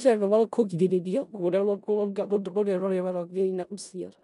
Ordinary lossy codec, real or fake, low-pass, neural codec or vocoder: none; fake; 10.8 kHz; codec, 16 kHz in and 24 kHz out, 0.4 kbps, LongCat-Audio-Codec, four codebook decoder